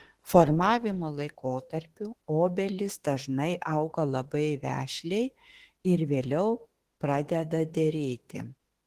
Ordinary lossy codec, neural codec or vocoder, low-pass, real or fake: Opus, 16 kbps; autoencoder, 48 kHz, 32 numbers a frame, DAC-VAE, trained on Japanese speech; 14.4 kHz; fake